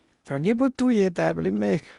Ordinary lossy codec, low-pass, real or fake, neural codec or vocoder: Opus, 64 kbps; 10.8 kHz; fake; codec, 16 kHz in and 24 kHz out, 0.8 kbps, FocalCodec, streaming, 65536 codes